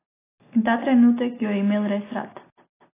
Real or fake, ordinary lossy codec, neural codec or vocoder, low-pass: real; AAC, 16 kbps; none; 3.6 kHz